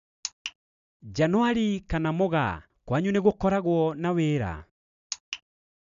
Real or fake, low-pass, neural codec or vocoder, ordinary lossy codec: real; 7.2 kHz; none; none